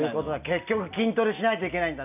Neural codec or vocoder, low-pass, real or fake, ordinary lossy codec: none; 3.6 kHz; real; none